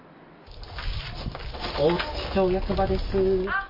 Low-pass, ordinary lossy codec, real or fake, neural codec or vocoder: 5.4 kHz; MP3, 24 kbps; real; none